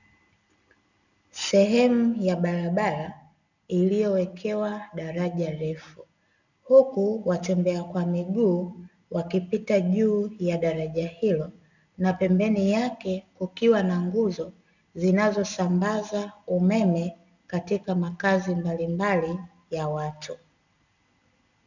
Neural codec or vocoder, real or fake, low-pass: none; real; 7.2 kHz